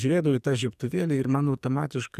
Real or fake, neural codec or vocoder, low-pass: fake; codec, 44.1 kHz, 2.6 kbps, SNAC; 14.4 kHz